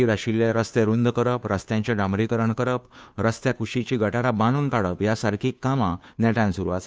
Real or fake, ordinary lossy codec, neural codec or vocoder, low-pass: fake; none; codec, 16 kHz, 2 kbps, FunCodec, trained on Chinese and English, 25 frames a second; none